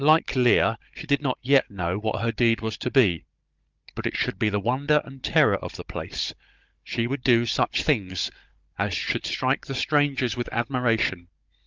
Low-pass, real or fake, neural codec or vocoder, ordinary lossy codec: 7.2 kHz; fake; codec, 16 kHz, 16 kbps, FunCodec, trained on Chinese and English, 50 frames a second; Opus, 24 kbps